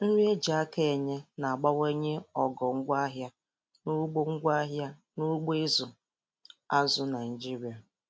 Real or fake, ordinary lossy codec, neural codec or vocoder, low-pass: real; none; none; none